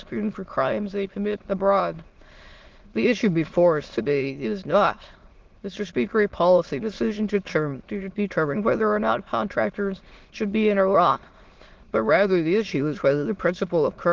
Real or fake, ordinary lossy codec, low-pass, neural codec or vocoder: fake; Opus, 16 kbps; 7.2 kHz; autoencoder, 22.05 kHz, a latent of 192 numbers a frame, VITS, trained on many speakers